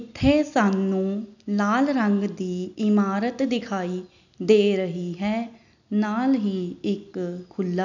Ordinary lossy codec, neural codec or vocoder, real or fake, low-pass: none; none; real; 7.2 kHz